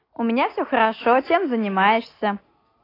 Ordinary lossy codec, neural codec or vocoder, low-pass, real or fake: AAC, 24 kbps; none; 5.4 kHz; real